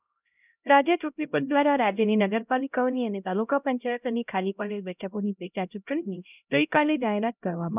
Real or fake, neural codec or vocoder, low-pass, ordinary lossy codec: fake; codec, 16 kHz, 0.5 kbps, X-Codec, HuBERT features, trained on LibriSpeech; 3.6 kHz; none